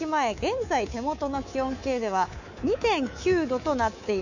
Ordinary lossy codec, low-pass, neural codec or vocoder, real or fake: none; 7.2 kHz; codec, 24 kHz, 3.1 kbps, DualCodec; fake